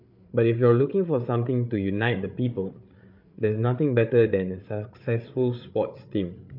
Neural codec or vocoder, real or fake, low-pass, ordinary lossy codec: codec, 16 kHz, 8 kbps, FreqCodec, larger model; fake; 5.4 kHz; MP3, 48 kbps